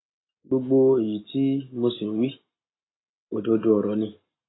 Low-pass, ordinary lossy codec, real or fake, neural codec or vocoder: 7.2 kHz; AAC, 16 kbps; real; none